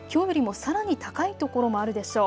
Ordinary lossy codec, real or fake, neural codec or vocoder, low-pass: none; real; none; none